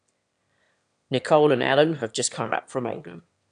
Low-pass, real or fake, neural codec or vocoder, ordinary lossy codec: 9.9 kHz; fake; autoencoder, 22.05 kHz, a latent of 192 numbers a frame, VITS, trained on one speaker; none